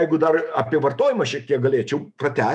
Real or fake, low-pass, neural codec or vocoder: fake; 10.8 kHz; autoencoder, 48 kHz, 128 numbers a frame, DAC-VAE, trained on Japanese speech